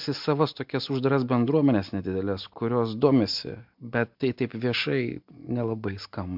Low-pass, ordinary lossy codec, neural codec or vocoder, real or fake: 5.4 kHz; MP3, 48 kbps; none; real